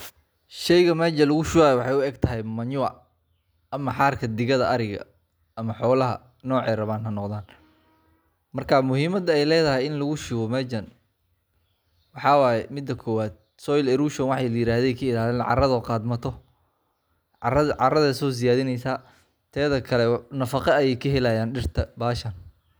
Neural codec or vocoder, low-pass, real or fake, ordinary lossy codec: none; none; real; none